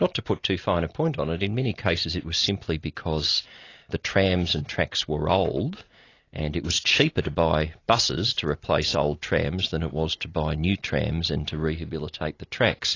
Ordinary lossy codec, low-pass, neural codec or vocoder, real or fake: AAC, 32 kbps; 7.2 kHz; none; real